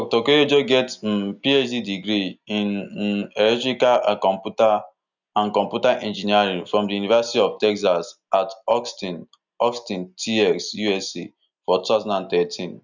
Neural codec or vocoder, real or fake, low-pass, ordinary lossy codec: none; real; 7.2 kHz; none